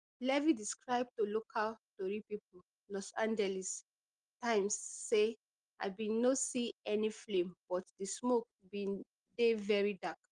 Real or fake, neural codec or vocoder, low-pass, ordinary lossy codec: real; none; 9.9 kHz; Opus, 24 kbps